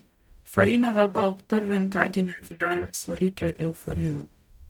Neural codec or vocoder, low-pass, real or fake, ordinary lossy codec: codec, 44.1 kHz, 0.9 kbps, DAC; 19.8 kHz; fake; none